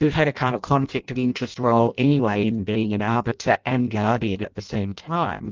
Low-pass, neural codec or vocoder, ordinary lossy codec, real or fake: 7.2 kHz; codec, 16 kHz in and 24 kHz out, 0.6 kbps, FireRedTTS-2 codec; Opus, 24 kbps; fake